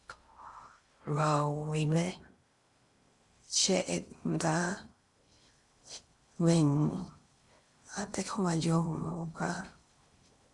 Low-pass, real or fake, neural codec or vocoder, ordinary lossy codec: 10.8 kHz; fake; codec, 16 kHz in and 24 kHz out, 0.6 kbps, FocalCodec, streaming, 2048 codes; Opus, 64 kbps